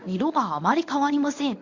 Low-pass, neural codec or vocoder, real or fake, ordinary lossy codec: 7.2 kHz; codec, 24 kHz, 0.9 kbps, WavTokenizer, medium speech release version 1; fake; none